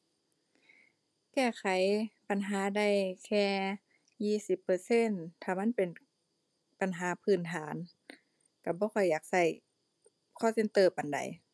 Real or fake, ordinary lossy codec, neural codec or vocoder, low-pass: real; none; none; none